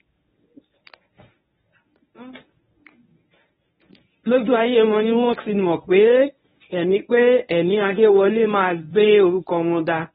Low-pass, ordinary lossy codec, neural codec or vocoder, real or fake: 10.8 kHz; AAC, 16 kbps; codec, 24 kHz, 0.9 kbps, WavTokenizer, medium speech release version 1; fake